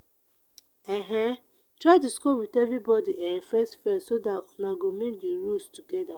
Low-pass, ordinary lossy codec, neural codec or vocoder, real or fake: 19.8 kHz; none; codec, 44.1 kHz, 7.8 kbps, DAC; fake